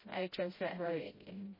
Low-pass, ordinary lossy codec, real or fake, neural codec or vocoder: 5.4 kHz; MP3, 24 kbps; fake; codec, 16 kHz, 0.5 kbps, FreqCodec, smaller model